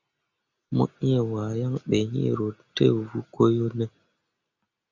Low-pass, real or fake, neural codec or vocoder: 7.2 kHz; real; none